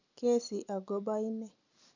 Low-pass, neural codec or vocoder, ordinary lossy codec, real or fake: 7.2 kHz; none; none; real